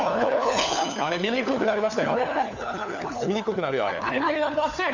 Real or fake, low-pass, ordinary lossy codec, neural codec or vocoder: fake; 7.2 kHz; none; codec, 16 kHz, 4 kbps, FunCodec, trained on LibriTTS, 50 frames a second